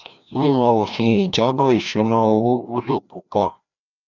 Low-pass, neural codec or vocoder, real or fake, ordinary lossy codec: 7.2 kHz; codec, 16 kHz, 1 kbps, FreqCodec, larger model; fake; none